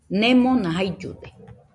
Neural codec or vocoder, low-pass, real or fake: none; 10.8 kHz; real